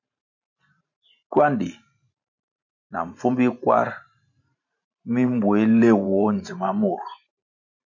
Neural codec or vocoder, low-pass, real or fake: vocoder, 44.1 kHz, 128 mel bands every 512 samples, BigVGAN v2; 7.2 kHz; fake